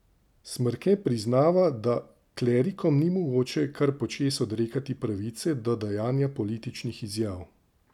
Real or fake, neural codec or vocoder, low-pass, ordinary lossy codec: real; none; 19.8 kHz; none